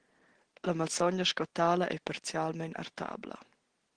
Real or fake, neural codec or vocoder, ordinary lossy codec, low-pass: real; none; Opus, 16 kbps; 9.9 kHz